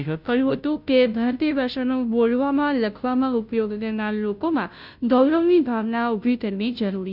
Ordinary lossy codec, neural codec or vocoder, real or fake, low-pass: none; codec, 16 kHz, 0.5 kbps, FunCodec, trained on Chinese and English, 25 frames a second; fake; 5.4 kHz